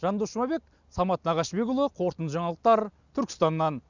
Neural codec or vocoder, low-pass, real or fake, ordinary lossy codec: none; 7.2 kHz; real; none